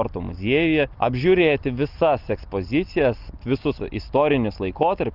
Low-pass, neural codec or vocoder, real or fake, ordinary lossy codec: 5.4 kHz; none; real; Opus, 32 kbps